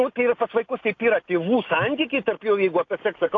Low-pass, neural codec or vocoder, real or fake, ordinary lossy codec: 7.2 kHz; none; real; AAC, 48 kbps